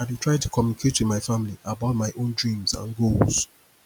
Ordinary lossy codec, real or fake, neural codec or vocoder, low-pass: none; real; none; none